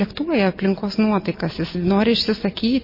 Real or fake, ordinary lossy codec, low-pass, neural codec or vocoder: real; MP3, 24 kbps; 5.4 kHz; none